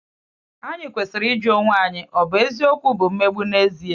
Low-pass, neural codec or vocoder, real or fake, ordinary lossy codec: 7.2 kHz; none; real; Opus, 64 kbps